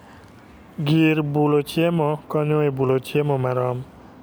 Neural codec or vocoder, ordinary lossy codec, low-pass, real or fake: none; none; none; real